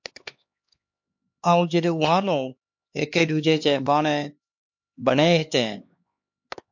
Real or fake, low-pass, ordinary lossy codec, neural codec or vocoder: fake; 7.2 kHz; MP3, 48 kbps; codec, 16 kHz, 2 kbps, X-Codec, HuBERT features, trained on LibriSpeech